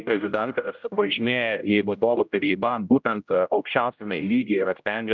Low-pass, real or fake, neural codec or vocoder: 7.2 kHz; fake; codec, 16 kHz, 0.5 kbps, X-Codec, HuBERT features, trained on general audio